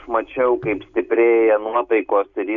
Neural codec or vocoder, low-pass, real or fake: none; 7.2 kHz; real